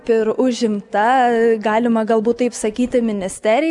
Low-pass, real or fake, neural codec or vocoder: 10.8 kHz; real; none